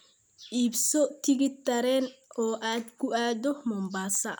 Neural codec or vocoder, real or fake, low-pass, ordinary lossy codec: none; real; none; none